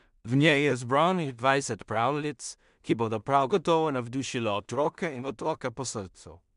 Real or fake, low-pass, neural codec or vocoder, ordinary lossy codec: fake; 10.8 kHz; codec, 16 kHz in and 24 kHz out, 0.4 kbps, LongCat-Audio-Codec, two codebook decoder; MP3, 96 kbps